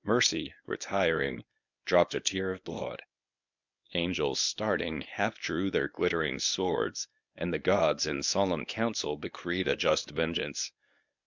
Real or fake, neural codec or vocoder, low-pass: fake; codec, 24 kHz, 0.9 kbps, WavTokenizer, medium speech release version 1; 7.2 kHz